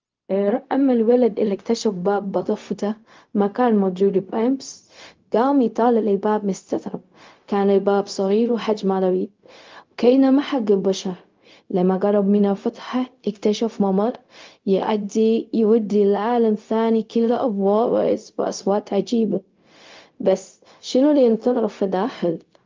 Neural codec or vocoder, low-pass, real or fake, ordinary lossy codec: codec, 16 kHz, 0.4 kbps, LongCat-Audio-Codec; 7.2 kHz; fake; Opus, 32 kbps